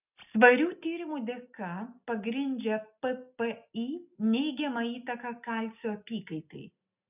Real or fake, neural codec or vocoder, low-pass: real; none; 3.6 kHz